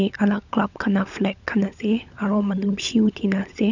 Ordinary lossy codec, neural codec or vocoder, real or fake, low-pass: none; codec, 16 kHz, 16 kbps, FunCodec, trained on LibriTTS, 50 frames a second; fake; 7.2 kHz